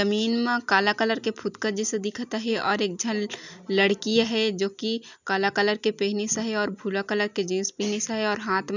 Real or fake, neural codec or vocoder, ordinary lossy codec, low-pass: real; none; none; 7.2 kHz